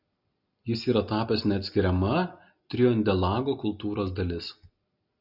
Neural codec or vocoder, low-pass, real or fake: none; 5.4 kHz; real